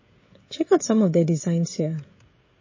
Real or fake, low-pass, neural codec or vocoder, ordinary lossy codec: fake; 7.2 kHz; codec, 16 kHz, 16 kbps, FreqCodec, smaller model; MP3, 32 kbps